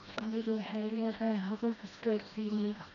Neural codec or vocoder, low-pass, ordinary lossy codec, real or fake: codec, 16 kHz, 1 kbps, FreqCodec, smaller model; 7.2 kHz; none; fake